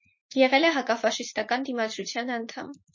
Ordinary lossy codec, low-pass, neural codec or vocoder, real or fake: MP3, 32 kbps; 7.2 kHz; vocoder, 44.1 kHz, 80 mel bands, Vocos; fake